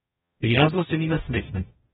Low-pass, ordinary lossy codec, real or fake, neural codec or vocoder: 19.8 kHz; AAC, 16 kbps; fake; codec, 44.1 kHz, 0.9 kbps, DAC